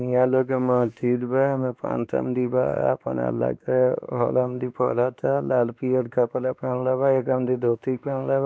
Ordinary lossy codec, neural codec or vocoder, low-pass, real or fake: none; codec, 16 kHz, 2 kbps, X-Codec, WavLM features, trained on Multilingual LibriSpeech; none; fake